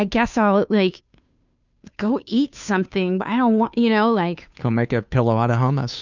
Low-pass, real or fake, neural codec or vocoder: 7.2 kHz; fake; codec, 16 kHz, 2 kbps, FunCodec, trained on Chinese and English, 25 frames a second